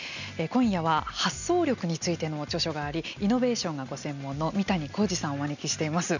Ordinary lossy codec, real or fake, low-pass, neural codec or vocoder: none; real; 7.2 kHz; none